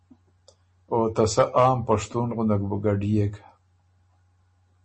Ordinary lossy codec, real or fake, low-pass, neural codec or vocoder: MP3, 32 kbps; real; 10.8 kHz; none